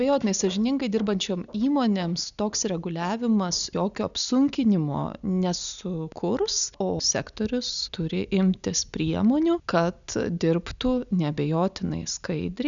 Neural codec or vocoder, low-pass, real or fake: none; 7.2 kHz; real